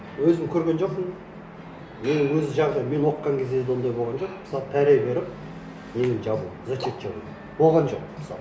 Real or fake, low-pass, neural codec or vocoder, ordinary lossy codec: real; none; none; none